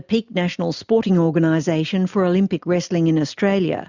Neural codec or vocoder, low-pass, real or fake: none; 7.2 kHz; real